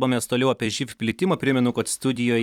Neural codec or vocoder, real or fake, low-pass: vocoder, 44.1 kHz, 128 mel bands every 256 samples, BigVGAN v2; fake; 19.8 kHz